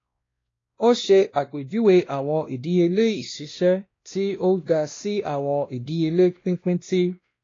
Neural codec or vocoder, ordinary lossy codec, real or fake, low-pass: codec, 16 kHz, 1 kbps, X-Codec, WavLM features, trained on Multilingual LibriSpeech; AAC, 32 kbps; fake; 7.2 kHz